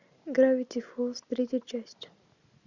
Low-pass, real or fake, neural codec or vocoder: 7.2 kHz; real; none